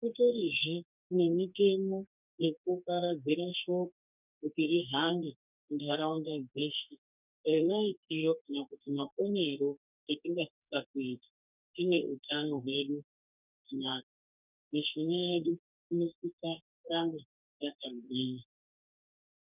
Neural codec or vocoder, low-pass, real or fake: codec, 32 kHz, 1.9 kbps, SNAC; 3.6 kHz; fake